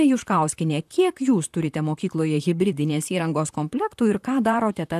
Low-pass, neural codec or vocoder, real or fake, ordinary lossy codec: 14.4 kHz; vocoder, 44.1 kHz, 128 mel bands, Pupu-Vocoder; fake; AAC, 96 kbps